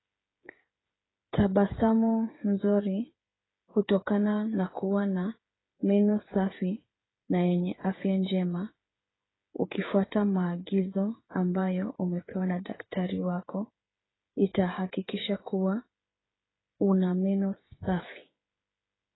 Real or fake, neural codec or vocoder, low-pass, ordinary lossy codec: fake; codec, 16 kHz, 8 kbps, FreqCodec, smaller model; 7.2 kHz; AAC, 16 kbps